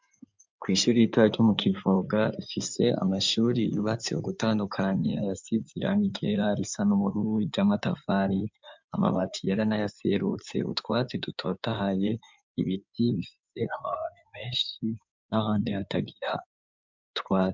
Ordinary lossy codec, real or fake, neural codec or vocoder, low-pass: MP3, 64 kbps; fake; codec, 16 kHz in and 24 kHz out, 2.2 kbps, FireRedTTS-2 codec; 7.2 kHz